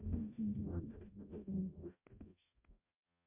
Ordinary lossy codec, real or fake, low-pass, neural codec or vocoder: MP3, 32 kbps; fake; 3.6 kHz; codec, 44.1 kHz, 0.9 kbps, DAC